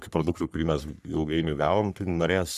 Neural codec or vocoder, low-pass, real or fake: codec, 44.1 kHz, 3.4 kbps, Pupu-Codec; 14.4 kHz; fake